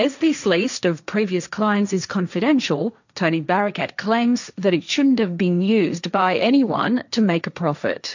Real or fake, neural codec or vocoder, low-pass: fake; codec, 16 kHz, 1.1 kbps, Voila-Tokenizer; 7.2 kHz